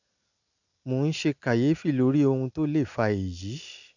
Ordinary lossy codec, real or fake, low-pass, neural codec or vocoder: none; real; 7.2 kHz; none